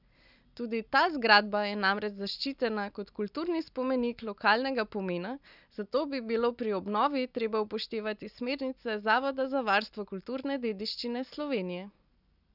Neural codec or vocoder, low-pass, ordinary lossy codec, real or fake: none; 5.4 kHz; none; real